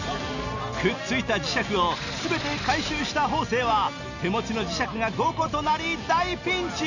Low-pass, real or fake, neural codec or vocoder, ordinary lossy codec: 7.2 kHz; real; none; none